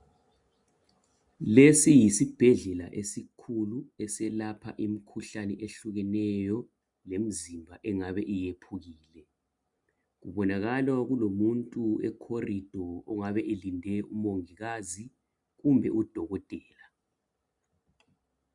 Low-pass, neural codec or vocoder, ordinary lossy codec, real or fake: 10.8 kHz; none; MP3, 96 kbps; real